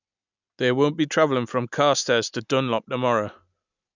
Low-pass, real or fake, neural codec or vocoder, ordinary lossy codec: 7.2 kHz; real; none; none